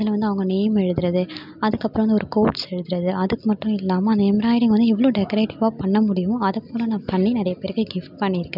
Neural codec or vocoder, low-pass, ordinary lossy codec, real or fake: none; 5.4 kHz; none; real